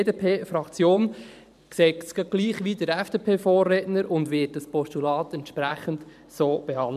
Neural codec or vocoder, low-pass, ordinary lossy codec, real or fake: vocoder, 44.1 kHz, 128 mel bands every 512 samples, BigVGAN v2; 14.4 kHz; none; fake